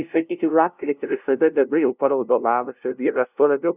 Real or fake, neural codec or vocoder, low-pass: fake; codec, 16 kHz, 0.5 kbps, FunCodec, trained on LibriTTS, 25 frames a second; 3.6 kHz